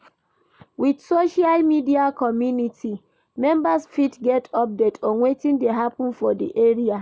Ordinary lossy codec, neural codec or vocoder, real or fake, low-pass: none; none; real; none